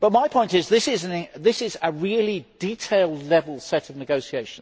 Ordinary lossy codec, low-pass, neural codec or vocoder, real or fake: none; none; none; real